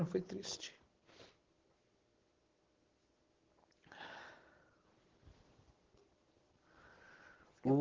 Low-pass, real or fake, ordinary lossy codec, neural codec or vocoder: 7.2 kHz; real; Opus, 16 kbps; none